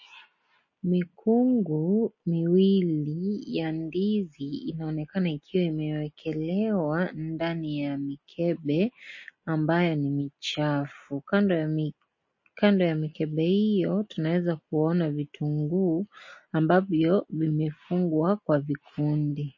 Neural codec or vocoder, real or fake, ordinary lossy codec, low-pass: none; real; MP3, 32 kbps; 7.2 kHz